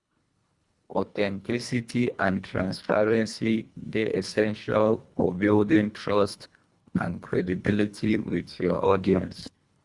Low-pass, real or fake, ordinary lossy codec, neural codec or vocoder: 10.8 kHz; fake; Opus, 64 kbps; codec, 24 kHz, 1.5 kbps, HILCodec